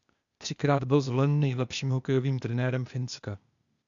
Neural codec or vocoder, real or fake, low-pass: codec, 16 kHz, 0.8 kbps, ZipCodec; fake; 7.2 kHz